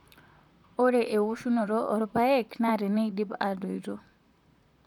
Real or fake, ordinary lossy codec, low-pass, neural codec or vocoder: fake; none; 19.8 kHz; vocoder, 44.1 kHz, 128 mel bands, Pupu-Vocoder